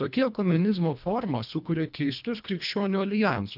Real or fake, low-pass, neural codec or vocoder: fake; 5.4 kHz; codec, 24 kHz, 1.5 kbps, HILCodec